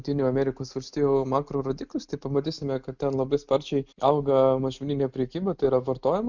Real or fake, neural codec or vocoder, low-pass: real; none; 7.2 kHz